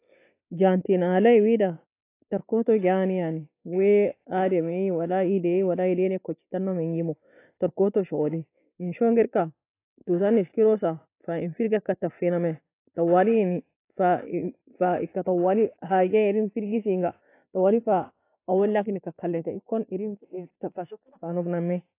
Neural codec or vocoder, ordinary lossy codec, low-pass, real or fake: none; AAC, 24 kbps; 3.6 kHz; real